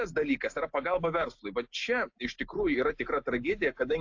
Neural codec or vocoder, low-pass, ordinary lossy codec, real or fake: none; 7.2 kHz; AAC, 48 kbps; real